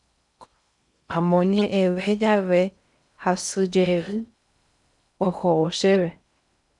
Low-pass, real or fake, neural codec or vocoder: 10.8 kHz; fake; codec, 16 kHz in and 24 kHz out, 0.6 kbps, FocalCodec, streaming, 4096 codes